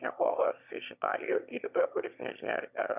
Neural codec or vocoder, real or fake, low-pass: autoencoder, 22.05 kHz, a latent of 192 numbers a frame, VITS, trained on one speaker; fake; 3.6 kHz